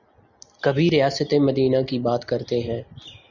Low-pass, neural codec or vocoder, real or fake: 7.2 kHz; none; real